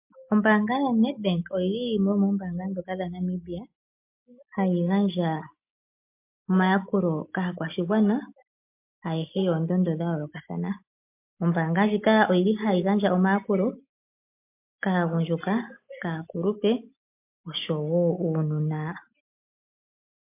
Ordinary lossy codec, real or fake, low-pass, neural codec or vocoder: MP3, 32 kbps; real; 3.6 kHz; none